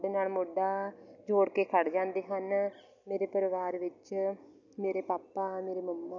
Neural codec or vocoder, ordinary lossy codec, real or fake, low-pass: none; none; real; 7.2 kHz